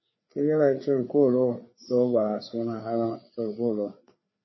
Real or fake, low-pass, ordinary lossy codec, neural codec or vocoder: fake; 7.2 kHz; MP3, 24 kbps; codec, 16 kHz, 4 kbps, FreqCodec, larger model